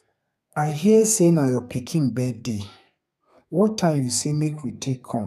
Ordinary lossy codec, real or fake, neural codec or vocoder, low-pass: none; fake; codec, 32 kHz, 1.9 kbps, SNAC; 14.4 kHz